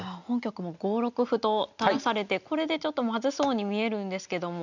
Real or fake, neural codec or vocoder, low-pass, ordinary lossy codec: real; none; 7.2 kHz; none